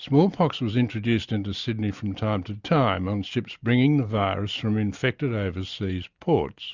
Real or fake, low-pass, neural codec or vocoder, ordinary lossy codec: real; 7.2 kHz; none; Opus, 64 kbps